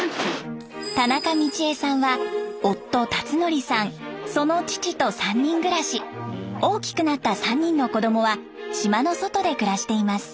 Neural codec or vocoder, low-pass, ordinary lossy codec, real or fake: none; none; none; real